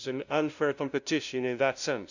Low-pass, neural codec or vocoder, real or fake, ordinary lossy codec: 7.2 kHz; codec, 16 kHz, 0.5 kbps, FunCodec, trained on LibriTTS, 25 frames a second; fake; none